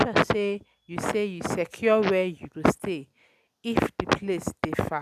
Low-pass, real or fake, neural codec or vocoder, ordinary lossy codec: 14.4 kHz; real; none; none